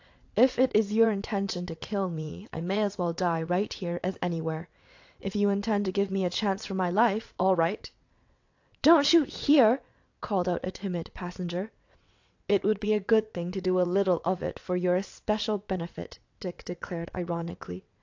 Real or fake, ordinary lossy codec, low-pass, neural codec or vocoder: fake; AAC, 48 kbps; 7.2 kHz; vocoder, 22.05 kHz, 80 mel bands, WaveNeXt